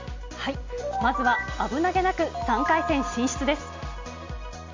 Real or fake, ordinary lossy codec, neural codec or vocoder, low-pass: real; MP3, 48 kbps; none; 7.2 kHz